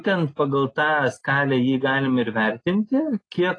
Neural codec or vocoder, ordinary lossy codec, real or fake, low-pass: none; AAC, 32 kbps; real; 9.9 kHz